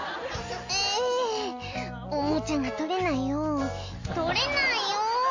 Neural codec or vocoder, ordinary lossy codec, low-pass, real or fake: none; AAC, 48 kbps; 7.2 kHz; real